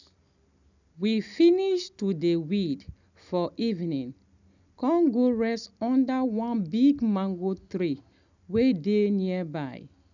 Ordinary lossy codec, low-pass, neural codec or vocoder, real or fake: none; 7.2 kHz; none; real